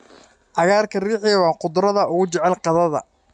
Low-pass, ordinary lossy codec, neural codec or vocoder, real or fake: 10.8 kHz; MP3, 64 kbps; none; real